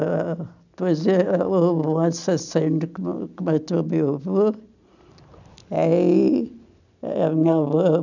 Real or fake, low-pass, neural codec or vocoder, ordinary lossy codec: real; 7.2 kHz; none; none